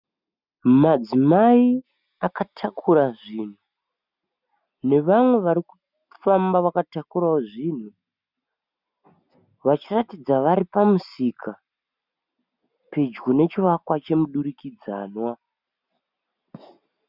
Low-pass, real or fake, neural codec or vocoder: 5.4 kHz; real; none